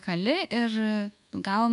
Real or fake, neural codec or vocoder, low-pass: fake; codec, 24 kHz, 1.2 kbps, DualCodec; 10.8 kHz